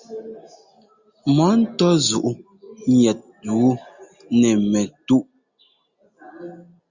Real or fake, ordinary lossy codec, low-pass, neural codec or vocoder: real; Opus, 64 kbps; 7.2 kHz; none